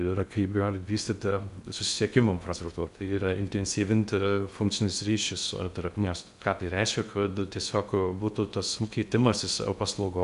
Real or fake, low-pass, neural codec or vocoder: fake; 10.8 kHz; codec, 16 kHz in and 24 kHz out, 0.6 kbps, FocalCodec, streaming, 2048 codes